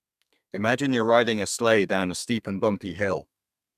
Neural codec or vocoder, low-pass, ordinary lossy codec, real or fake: codec, 32 kHz, 1.9 kbps, SNAC; 14.4 kHz; none; fake